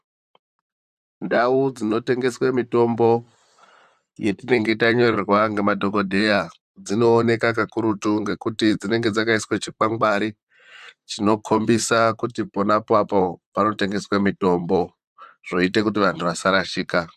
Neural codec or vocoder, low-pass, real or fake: vocoder, 44.1 kHz, 128 mel bands, Pupu-Vocoder; 14.4 kHz; fake